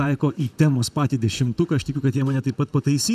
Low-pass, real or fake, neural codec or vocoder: 14.4 kHz; fake; vocoder, 44.1 kHz, 128 mel bands, Pupu-Vocoder